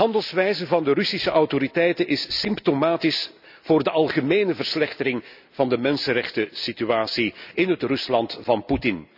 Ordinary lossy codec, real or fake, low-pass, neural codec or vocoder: none; real; 5.4 kHz; none